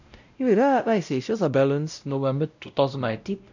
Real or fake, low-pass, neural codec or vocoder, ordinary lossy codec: fake; 7.2 kHz; codec, 16 kHz, 0.5 kbps, X-Codec, WavLM features, trained on Multilingual LibriSpeech; none